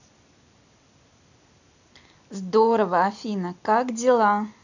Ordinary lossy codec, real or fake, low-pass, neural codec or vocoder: none; real; 7.2 kHz; none